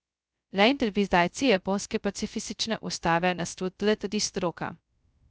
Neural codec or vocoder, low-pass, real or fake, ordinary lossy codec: codec, 16 kHz, 0.2 kbps, FocalCodec; none; fake; none